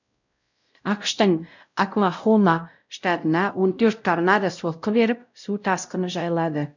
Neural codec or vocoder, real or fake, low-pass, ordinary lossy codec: codec, 16 kHz, 0.5 kbps, X-Codec, WavLM features, trained on Multilingual LibriSpeech; fake; 7.2 kHz; none